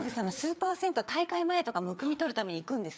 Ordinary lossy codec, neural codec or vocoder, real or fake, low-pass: none; codec, 16 kHz, 4 kbps, FreqCodec, larger model; fake; none